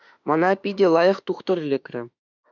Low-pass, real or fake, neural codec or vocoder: 7.2 kHz; fake; autoencoder, 48 kHz, 32 numbers a frame, DAC-VAE, trained on Japanese speech